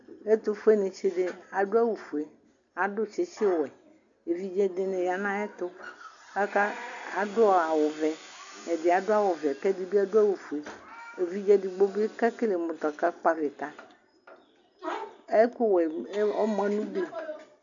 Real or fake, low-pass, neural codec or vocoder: real; 7.2 kHz; none